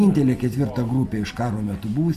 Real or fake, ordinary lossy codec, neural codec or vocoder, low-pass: real; AAC, 96 kbps; none; 14.4 kHz